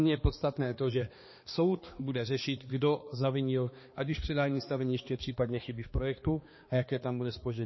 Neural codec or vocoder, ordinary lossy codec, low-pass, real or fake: codec, 16 kHz, 2 kbps, X-Codec, HuBERT features, trained on balanced general audio; MP3, 24 kbps; 7.2 kHz; fake